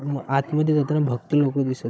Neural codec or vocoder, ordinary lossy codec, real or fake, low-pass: codec, 16 kHz, 16 kbps, FunCodec, trained on Chinese and English, 50 frames a second; none; fake; none